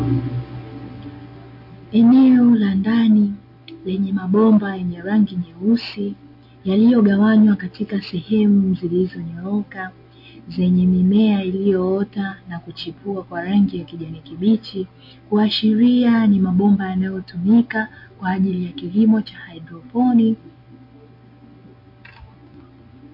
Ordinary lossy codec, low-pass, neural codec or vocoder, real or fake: MP3, 32 kbps; 5.4 kHz; none; real